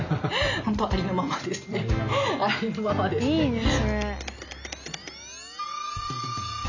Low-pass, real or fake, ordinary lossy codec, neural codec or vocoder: 7.2 kHz; real; none; none